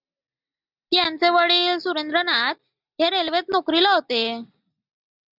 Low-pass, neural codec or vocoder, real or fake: 5.4 kHz; none; real